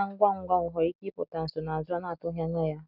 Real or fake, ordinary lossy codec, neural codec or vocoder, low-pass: real; none; none; 5.4 kHz